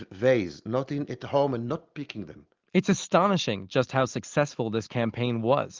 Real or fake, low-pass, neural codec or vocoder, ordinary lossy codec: real; 7.2 kHz; none; Opus, 32 kbps